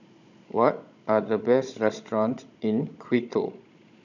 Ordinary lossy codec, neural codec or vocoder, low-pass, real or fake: none; codec, 16 kHz, 16 kbps, FunCodec, trained on Chinese and English, 50 frames a second; 7.2 kHz; fake